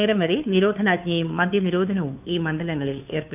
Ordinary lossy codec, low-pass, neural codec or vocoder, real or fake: none; 3.6 kHz; codec, 16 kHz, 2 kbps, FunCodec, trained on Chinese and English, 25 frames a second; fake